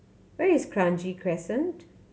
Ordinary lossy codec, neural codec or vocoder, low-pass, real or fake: none; none; none; real